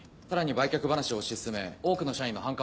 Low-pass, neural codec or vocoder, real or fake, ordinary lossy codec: none; none; real; none